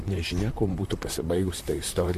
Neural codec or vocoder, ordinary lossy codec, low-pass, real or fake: vocoder, 44.1 kHz, 128 mel bands, Pupu-Vocoder; MP3, 64 kbps; 14.4 kHz; fake